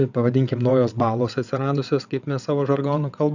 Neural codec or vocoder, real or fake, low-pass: vocoder, 22.05 kHz, 80 mel bands, WaveNeXt; fake; 7.2 kHz